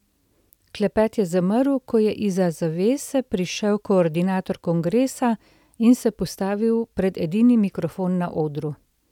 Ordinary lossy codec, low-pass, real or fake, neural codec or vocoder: none; 19.8 kHz; real; none